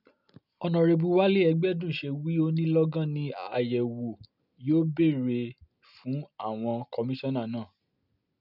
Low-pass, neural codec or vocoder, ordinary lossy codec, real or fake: 5.4 kHz; none; none; real